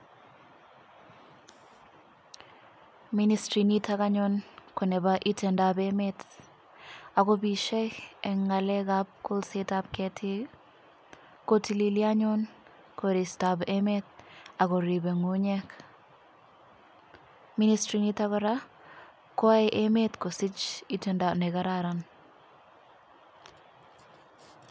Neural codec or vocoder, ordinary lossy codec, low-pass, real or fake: none; none; none; real